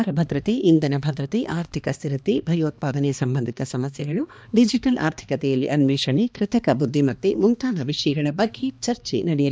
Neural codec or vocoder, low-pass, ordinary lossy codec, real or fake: codec, 16 kHz, 2 kbps, X-Codec, HuBERT features, trained on balanced general audio; none; none; fake